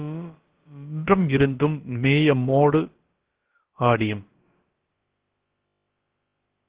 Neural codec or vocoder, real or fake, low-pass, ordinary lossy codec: codec, 16 kHz, about 1 kbps, DyCAST, with the encoder's durations; fake; 3.6 kHz; Opus, 16 kbps